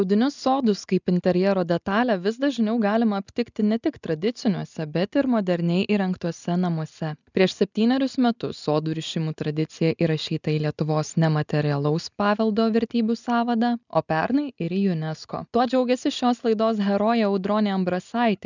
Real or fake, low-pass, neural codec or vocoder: real; 7.2 kHz; none